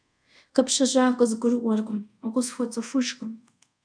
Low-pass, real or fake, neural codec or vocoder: 9.9 kHz; fake; codec, 24 kHz, 0.5 kbps, DualCodec